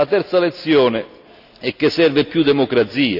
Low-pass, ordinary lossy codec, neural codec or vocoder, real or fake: 5.4 kHz; none; none; real